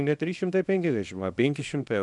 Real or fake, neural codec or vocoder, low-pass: fake; codec, 24 kHz, 0.9 kbps, WavTokenizer, small release; 10.8 kHz